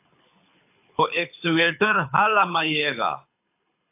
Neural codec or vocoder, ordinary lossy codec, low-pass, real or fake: codec, 24 kHz, 6 kbps, HILCodec; AAC, 24 kbps; 3.6 kHz; fake